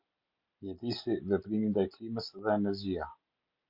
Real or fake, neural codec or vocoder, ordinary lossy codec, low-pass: real; none; Opus, 64 kbps; 5.4 kHz